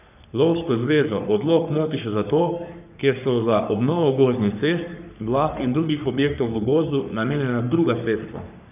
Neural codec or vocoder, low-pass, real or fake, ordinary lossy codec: codec, 44.1 kHz, 3.4 kbps, Pupu-Codec; 3.6 kHz; fake; none